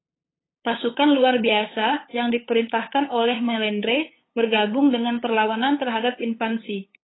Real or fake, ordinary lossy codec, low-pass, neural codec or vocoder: fake; AAC, 16 kbps; 7.2 kHz; codec, 16 kHz, 8 kbps, FunCodec, trained on LibriTTS, 25 frames a second